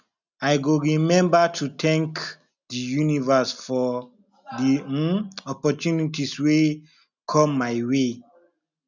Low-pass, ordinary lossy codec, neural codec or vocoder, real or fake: 7.2 kHz; none; none; real